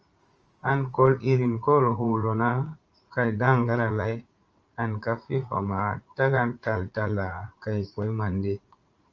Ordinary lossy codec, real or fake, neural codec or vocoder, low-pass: Opus, 24 kbps; fake; vocoder, 44.1 kHz, 80 mel bands, Vocos; 7.2 kHz